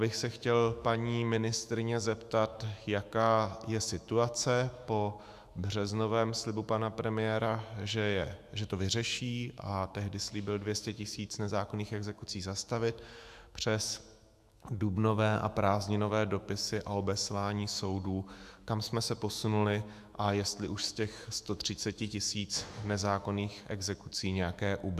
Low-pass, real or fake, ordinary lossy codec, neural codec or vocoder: 14.4 kHz; fake; Opus, 64 kbps; autoencoder, 48 kHz, 128 numbers a frame, DAC-VAE, trained on Japanese speech